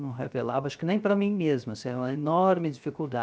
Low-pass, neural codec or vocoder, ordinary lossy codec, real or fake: none; codec, 16 kHz, 0.7 kbps, FocalCodec; none; fake